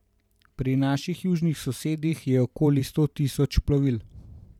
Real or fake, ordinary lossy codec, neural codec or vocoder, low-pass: fake; none; vocoder, 44.1 kHz, 128 mel bands every 512 samples, BigVGAN v2; 19.8 kHz